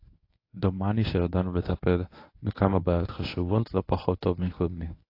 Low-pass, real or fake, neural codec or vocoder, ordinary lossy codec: 5.4 kHz; fake; codec, 24 kHz, 0.9 kbps, WavTokenizer, medium speech release version 1; AAC, 32 kbps